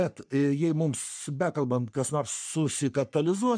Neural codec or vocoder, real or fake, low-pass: codec, 44.1 kHz, 3.4 kbps, Pupu-Codec; fake; 9.9 kHz